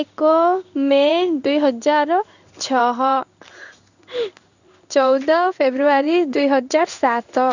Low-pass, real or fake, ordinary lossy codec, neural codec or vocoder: 7.2 kHz; fake; none; codec, 16 kHz in and 24 kHz out, 1 kbps, XY-Tokenizer